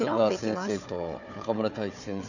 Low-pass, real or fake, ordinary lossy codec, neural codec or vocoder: 7.2 kHz; fake; none; codec, 16 kHz, 4 kbps, FunCodec, trained on Chinese and English, 50 frames a second